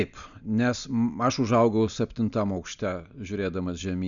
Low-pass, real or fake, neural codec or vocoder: 7.2 kHz; real; none